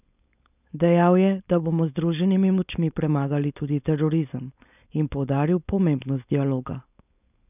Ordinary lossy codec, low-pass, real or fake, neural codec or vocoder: none; 3.6 kHz; fake; codec, 16 kHz, 4.8 kbps, FACodec